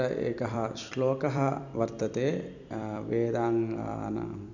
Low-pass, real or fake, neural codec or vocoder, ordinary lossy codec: 7.2 kHz; fake; vocoder, 44.1 kHz, 128 mel bands every 256 samples, BigVGAN v2; none